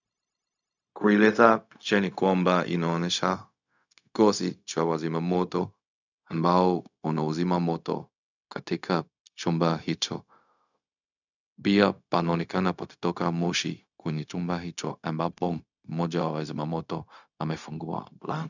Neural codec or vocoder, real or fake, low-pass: codec, 16 kHz, 0.4 kbps, LongCat-Audio-Codec; fake; 7.2 kHz